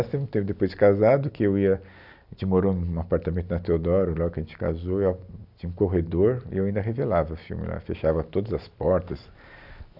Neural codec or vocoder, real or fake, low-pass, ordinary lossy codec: none; real; 5.4 kHz; none